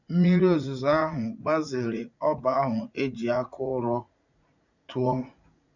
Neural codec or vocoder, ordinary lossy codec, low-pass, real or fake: vocoder, 44.1 kHz, 80 mel bands, Vocos; none; 7.2 kHz; fake